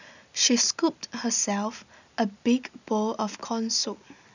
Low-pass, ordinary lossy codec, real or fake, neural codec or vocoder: 7.2 kHz; none; real; none